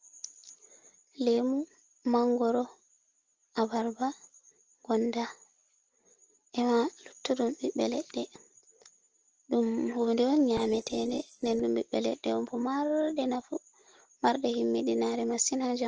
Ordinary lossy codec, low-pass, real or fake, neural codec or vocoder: Opus, 16 kbps; 7.2 kHz; real; none